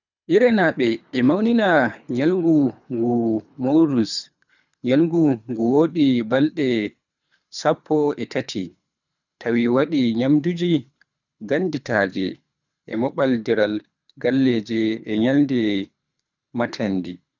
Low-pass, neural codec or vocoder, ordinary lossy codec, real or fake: 7.2 kHz; codec, 24 kHz, 3 kbps, HILCodec; none; fake